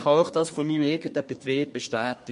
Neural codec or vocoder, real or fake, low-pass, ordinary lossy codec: codec, 24 kHz, 1 kbps, SNAC; fake; 10.8 kHz; MP3, 48 kbps